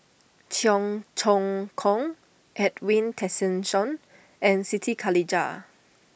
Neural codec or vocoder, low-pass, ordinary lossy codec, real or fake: none; none; none; real